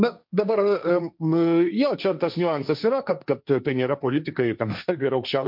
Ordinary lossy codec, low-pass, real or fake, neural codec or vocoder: MP3, 48 kbps; 5.4 kHz; fake; codec, 16 kHz, 1.1 kbps, Voila-Tokenizer